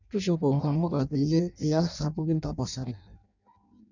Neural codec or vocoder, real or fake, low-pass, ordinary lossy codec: codec, 16 kHz in and 24 kHz out, 0.6 kbps, FireRedTTS-2 codec; fake; 7.2 kHz; none